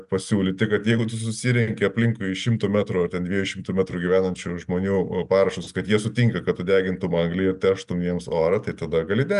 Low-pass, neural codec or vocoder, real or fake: 10.8 kHz; none; real